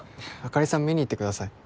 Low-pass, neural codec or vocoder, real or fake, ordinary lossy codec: none; none; real; none